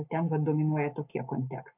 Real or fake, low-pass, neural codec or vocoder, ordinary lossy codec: real; 3.6 kHz; none; AAC, 32 kbps